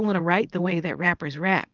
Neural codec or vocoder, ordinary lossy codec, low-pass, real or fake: codec, 24 kHz, 0.9 kbps, WavTokenizer, small release; Opus, 32 kbps; 7.2 kHz; fake